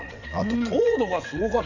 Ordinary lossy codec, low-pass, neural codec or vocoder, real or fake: none; 7.2 kHz; vocoder, 22.05 kHz, 80 mel bands, WaveNeXt; fake